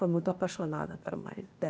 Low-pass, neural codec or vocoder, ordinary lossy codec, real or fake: none; codec, 16 kHz, 0.8 kbps, ZipCodec; none; fake